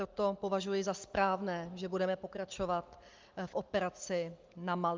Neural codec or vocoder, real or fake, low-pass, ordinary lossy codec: none; real; 7.2 kHz; Opus, 32 kbps